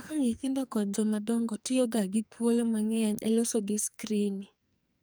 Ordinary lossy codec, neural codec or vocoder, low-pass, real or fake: none; codec, 44.1 kHz, 2.6 kbps, SNAC; none; fake